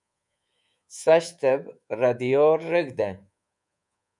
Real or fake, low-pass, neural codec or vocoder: fake; 10.8 kHz; codec, 24 kHz, 3.1 kbps, DualCodec